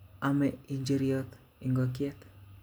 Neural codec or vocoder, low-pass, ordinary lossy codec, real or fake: none; none; none; real